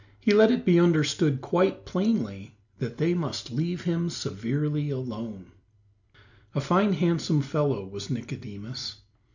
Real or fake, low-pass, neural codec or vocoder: real; 7.2 kHz; none